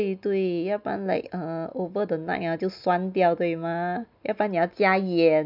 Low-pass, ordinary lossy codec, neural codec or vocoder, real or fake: 5.4 kHz; none; none; real